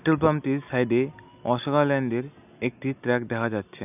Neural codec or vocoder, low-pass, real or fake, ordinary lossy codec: none; 3.6 kHz; real; none